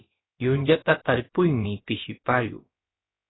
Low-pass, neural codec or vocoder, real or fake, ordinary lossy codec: 7.2 kHz; codec, 16 kHz, about 1 kbps, DyCAST, with the encoder's durations; fake; AAC, 16 kbps